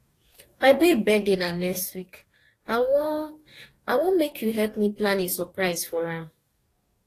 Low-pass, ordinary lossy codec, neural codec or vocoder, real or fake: 14.4 kHz; AAC, 48 kbps; codec, 44.1 kHz, 2.6 kbps, DAC; fake